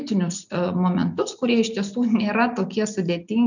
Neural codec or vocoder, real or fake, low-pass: none; real; 7.2 kHz